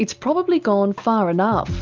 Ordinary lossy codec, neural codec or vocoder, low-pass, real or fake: Opus, 32 kbps; none; 7.2 kHz; real